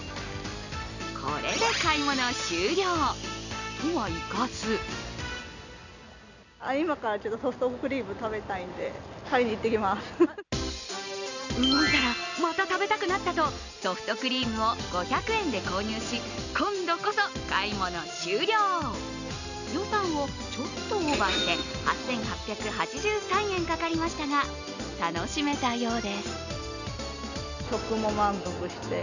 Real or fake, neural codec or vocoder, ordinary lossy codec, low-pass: real; none; none; 7.2 kHz